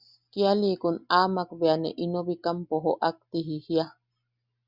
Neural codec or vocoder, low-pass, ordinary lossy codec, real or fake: none; 5.4 kHz; Opus, 64 kbps; real